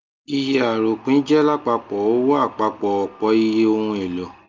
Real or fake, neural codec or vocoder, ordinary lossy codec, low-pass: real; none; Opus, 24 kbps; 7.2 kHz